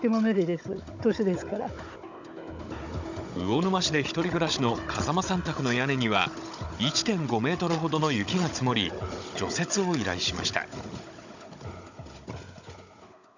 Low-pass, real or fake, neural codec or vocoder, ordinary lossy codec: 7.2 kHz; fake; codec, 16 kHz, 16 kbps, FunCodec, trained on Chinese and English, 50 frames a second; none